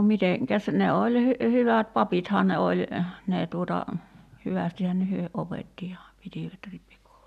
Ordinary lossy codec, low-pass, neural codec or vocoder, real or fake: none; 14.4 kHz; none; real